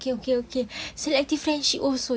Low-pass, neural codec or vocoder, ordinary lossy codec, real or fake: none; none; none; real